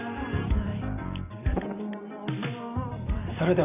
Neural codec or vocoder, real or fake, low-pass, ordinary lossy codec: vocoder, 44.1 kHz, 128 mel bands every 512 samples, BigVGAN v2; fake; 3.6 kHz; none